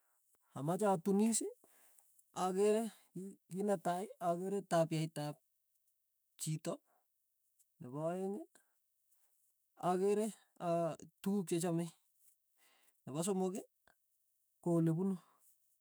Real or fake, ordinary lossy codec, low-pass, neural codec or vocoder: fake; none; none; autoencoder, 48 kHz, 128 numbers a frame, DAC-VAE, trained on Japanese speech